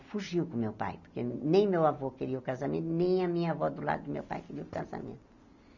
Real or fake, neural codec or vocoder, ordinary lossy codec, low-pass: real; none; none; 7.2 kHz